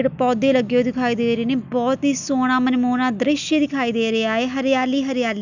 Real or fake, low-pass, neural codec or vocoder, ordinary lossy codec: real; 7.2 kHz; none; none